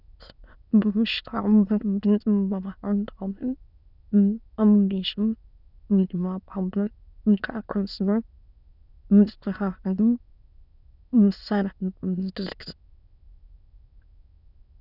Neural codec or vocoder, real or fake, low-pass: autoencoder, 22.05 kHz, a latent of 192 numbers a frame, VITS, trained on many speakers; fake; 5.4 kHz